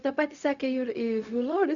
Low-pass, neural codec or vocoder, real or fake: 7.2 kHz; codec, 16 kHz, 0.4 kbps, LongCat-Audio-Codec; fake